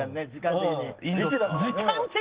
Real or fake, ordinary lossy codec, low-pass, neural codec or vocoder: real; Opus, 16 kbps; 3.6 kHz; none